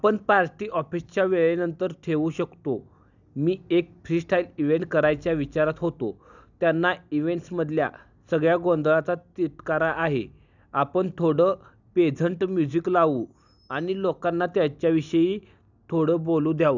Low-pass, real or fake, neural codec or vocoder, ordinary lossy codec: 7.2 kHz; real; none; none